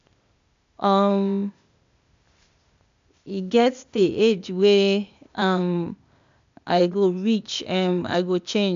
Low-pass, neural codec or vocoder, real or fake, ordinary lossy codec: 7.2 kHz; codec, 16 kHz, 0.8 kbps, ZipCodec; fake; MP3, 64 kbps